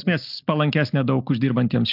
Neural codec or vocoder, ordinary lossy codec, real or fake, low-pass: none; AAC, 48 kbps; real; 5.4 kHz